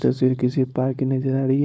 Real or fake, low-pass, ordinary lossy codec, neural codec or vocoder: fake; none; none; codec, 16 kHz, 4.8 kbps, FACodec